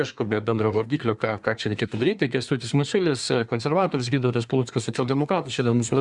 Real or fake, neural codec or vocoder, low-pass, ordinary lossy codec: fake; codec, 24 kHz, 1 kbps, SNAC; 10.8 kHz; Opus, 64 kbps